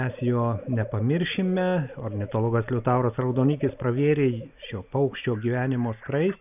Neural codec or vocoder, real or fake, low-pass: none; real; 3.6 kHz